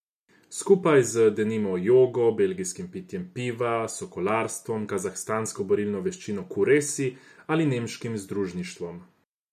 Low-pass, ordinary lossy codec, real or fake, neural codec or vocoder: 14.4 kHz; MP3, 96 kbps; real; none